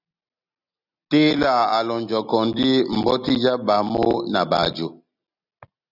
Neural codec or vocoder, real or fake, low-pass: none; real; 5.4 kHz